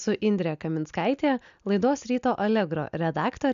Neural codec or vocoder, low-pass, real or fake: none; 7.2 kHz; real